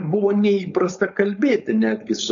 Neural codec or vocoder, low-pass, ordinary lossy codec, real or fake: codec, 16 kHz, 4.8 kbps, FACodec; 7.2 kHz; MP3, 96 kbps; fake